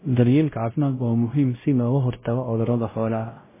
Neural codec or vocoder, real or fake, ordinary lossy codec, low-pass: codec, 16 kHz, 0.5 kbps, X-Codec, WavLM features, trained on Multilingual LibriSpeech; fake; MP3, 16 kbps; 3.6 kHz